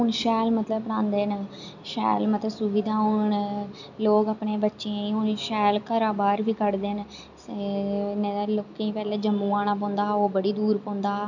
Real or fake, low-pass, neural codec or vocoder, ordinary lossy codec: real; 7.2 kHz; none; none